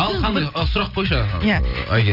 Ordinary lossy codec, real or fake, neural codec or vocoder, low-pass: none; real; none; 5.4 kHz